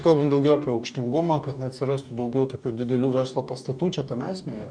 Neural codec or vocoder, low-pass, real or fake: codec, 44.1 kHz, 2.6 kbps, DAC; 9.9 kHz; fake